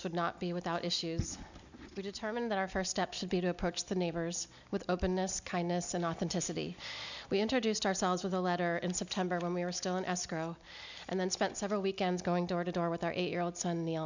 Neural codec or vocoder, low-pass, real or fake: none; 7.2 kHz; real